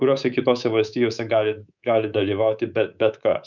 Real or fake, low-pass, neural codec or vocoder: fake; 7.2 kHz; codec, 24 kHz, 3.1 kbps, DualCodec